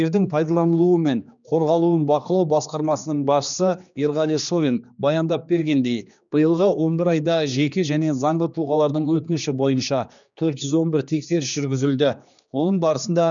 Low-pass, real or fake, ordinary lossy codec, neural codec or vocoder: 7.2 kHz; fake; none; codec, 16 kHz, 2 kbps, X-Codec, HuBERT features, trained on general audio